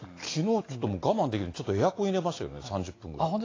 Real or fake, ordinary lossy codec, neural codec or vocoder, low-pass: real; AAC, 32 kbps; none; 7.2 kHz